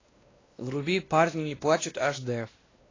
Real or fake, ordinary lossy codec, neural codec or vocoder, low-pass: fake; AAC, 32 kbps; codec, 16 kHz, 1 kbps, X-Codec, WavLM features, trained on Multilingual LibriSpeech; 7.2 kHz